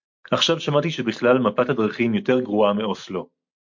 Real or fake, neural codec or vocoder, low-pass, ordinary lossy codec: real; none; 7.2 kHz; MP3, 48 kbps